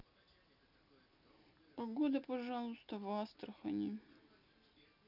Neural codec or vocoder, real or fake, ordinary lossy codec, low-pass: none; real; none; 5.4 kHz